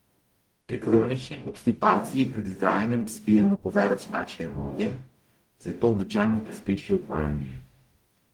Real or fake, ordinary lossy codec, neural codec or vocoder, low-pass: fake; Opus, 24 kbps; codec, 44.1 kHz, 0.9 kbps, DAC; 19.8 kHz